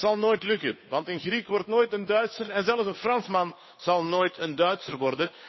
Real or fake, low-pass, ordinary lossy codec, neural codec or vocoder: fake; 7.2 kHz; MP3, 24 kbps; codec, 16 kHz, 2 kbps, FunCodec, trained on Chinese and English, 25 frames a second